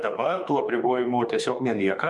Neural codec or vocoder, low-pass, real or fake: autoencoder, 48 kHz, 32 numbers a frame, DAC-VAE, trained on Japanese speech; 10.8 kHz; fake